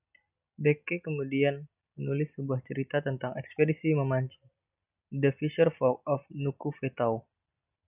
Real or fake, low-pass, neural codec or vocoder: real; 3.6 kHz; none